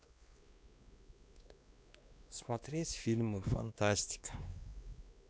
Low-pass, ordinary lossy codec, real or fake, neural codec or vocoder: none; none; fake; codec, 16 kHz, 2 kbps, X-Codec, WavLM features, trained on Multilingual LibriSpeech